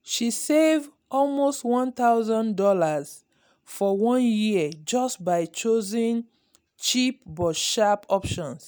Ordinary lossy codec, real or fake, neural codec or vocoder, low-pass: none; real; none; none